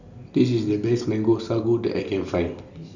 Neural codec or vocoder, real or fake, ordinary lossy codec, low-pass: none; real; none; 7.2 kHz